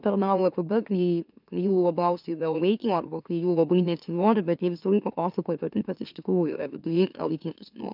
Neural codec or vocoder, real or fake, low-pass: autoencoder, 44.1 kHz, a latent of 192 numbers a frame, MeloTTS; fake; 5.4 kHz